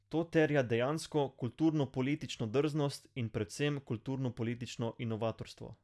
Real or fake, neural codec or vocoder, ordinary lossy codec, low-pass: real; none; none; none